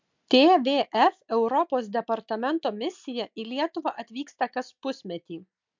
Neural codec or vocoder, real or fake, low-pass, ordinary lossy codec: none; real; 7.2 kHz; MP3, 64 kbps